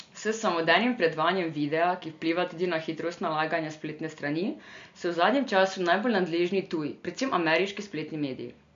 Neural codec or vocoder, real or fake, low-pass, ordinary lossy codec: none; real; 7.2 kHz; MP3, 48 kbps